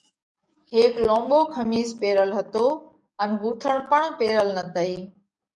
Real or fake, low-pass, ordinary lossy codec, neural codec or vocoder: fake; 10.8 kHz; MP3, 96 kbps; codec, 44.1 kHz, 7.8 kbps, DAC